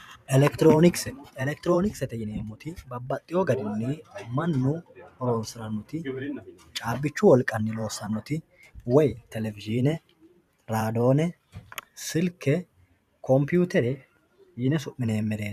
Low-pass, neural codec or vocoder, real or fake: 14.4 kHz; vocoder, 44.1 kHz, 128 mel bands every 512 samples, BigVGAN v2; fake